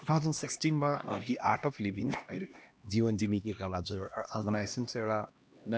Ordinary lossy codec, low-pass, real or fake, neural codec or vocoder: none; none; fake; codec, 16 kHz, 1 kbps, X-Codec, HuBERT features, trained on LibriSpeech